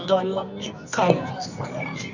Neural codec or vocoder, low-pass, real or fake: codec, 24 kHz, 0.9 kbps, WavTokenizer, medium music audio release; 7.2 kHz; fake